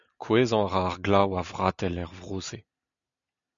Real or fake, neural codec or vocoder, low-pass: real; none; 7.2 kHz